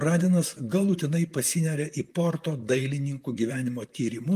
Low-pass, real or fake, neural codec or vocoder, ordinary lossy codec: 14.4 kHz; fake; vocoder, 44.1 kHz, 128 mel bands, Pupu-Vocoder; Opus, 32 kbps